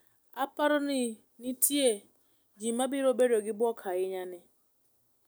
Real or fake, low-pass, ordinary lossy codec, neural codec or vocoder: real; none; none; none